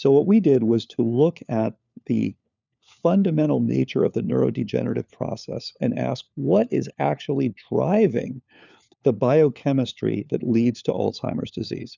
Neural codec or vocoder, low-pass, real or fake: codec, 16 kHz, 4 kbps, FunCodec, trained on LibriTTS, 50 frames a second; 7.2 kHz; fake